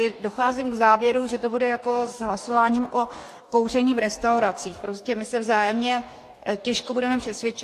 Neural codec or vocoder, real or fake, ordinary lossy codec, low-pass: codec, 44.1 kHz, 2.6 kbps, DAC; fake; AAC, 64 kbps; 14.4 kHz